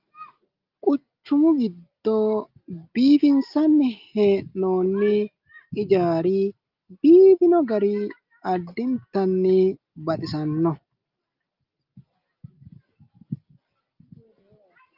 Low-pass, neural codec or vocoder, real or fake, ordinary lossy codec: 5.4 kHz; none; real; Opus, 32 kbps